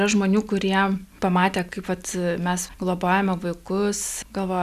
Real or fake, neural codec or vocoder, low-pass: real; none; 14.4 kHz